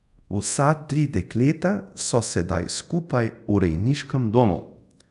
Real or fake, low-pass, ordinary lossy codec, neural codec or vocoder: fake; 10.8 kHz; none; codec, 24 kHz, 0.5 kbps, DualCodec